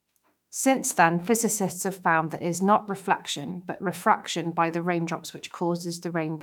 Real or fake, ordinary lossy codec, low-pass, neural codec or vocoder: fake; none; 19.8 kHz; autoencoder, 48 kHz, 32 numbers a frame, DAC-VAE, trained on Japanese speech